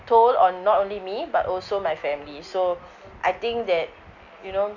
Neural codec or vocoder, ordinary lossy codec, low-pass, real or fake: none; none; 7.2 kHz; real